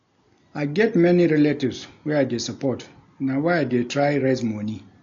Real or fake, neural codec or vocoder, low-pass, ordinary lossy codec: real; none; 7.2 kHz; AAC, 48 kbps